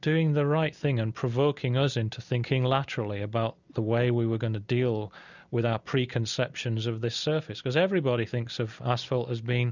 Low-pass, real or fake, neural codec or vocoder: 7.2 kHz; real; none